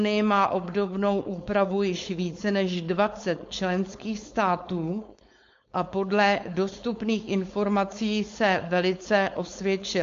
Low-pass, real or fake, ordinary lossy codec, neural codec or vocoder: 7.2 kHz; fake; MP3, 48 kbps; codec, 16 kHz, 4.8 kbps, FACodec